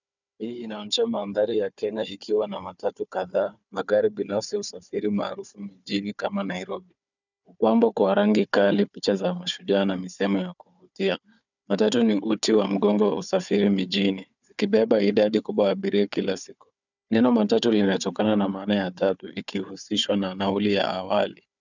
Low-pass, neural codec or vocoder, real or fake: 7.2 kHz; codec, 16 kHz, 4 kbps, FunCodec, trained on Chinese and English, 50 frames a second; fake